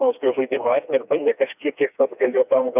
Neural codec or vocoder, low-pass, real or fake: codec, 16 kHz, 2 kbps, FreqCodec, smaller model; 3.6 kHz; fake